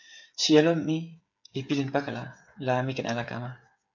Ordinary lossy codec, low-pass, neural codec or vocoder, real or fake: MP3, 64 kbps; 7.2 kHz; codec, 16 kHz, 8 kbps, FreqCodec, smaller model; fake